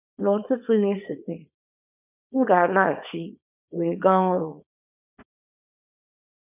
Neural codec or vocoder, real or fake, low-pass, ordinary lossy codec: codec, 24 kHz, 0.9 kbps, WavTokenizer, small release; fake; 3.6 kHz; none